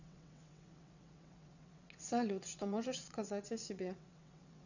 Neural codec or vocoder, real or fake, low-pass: vocoder, 22.05 kHz, 80 mel bands, WaveNeXt; fake; 7.2 kHz